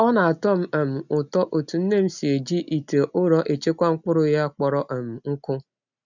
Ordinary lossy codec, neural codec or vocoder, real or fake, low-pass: none; none; real; 7.2 kHz